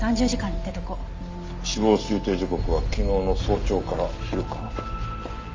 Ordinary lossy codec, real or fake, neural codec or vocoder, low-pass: Opus, 32 kbps; real; none; 7.2 kHz